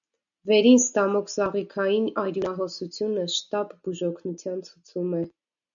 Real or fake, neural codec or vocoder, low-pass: real; none; 7.2 kHz